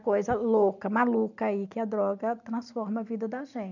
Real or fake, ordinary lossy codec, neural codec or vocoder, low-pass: real; none; none; 7.2 kHz